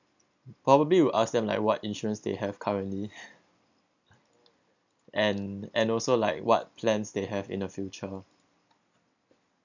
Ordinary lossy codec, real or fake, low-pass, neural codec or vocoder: none; real; 7.2 kHz; none